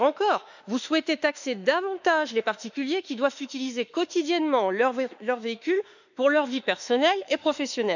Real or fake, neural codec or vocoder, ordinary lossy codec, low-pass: fake; autoencoder, 48 kHz, 32 numbers a frame, DAC-VAE, trained on Japanese speech; none; 7.2 kHz